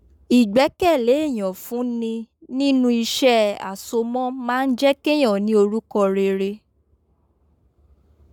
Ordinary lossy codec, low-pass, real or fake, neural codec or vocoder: none; 19.8 kHz; fake; codec, 44.1 kHz, 7.8 kbps, Pupu-Codec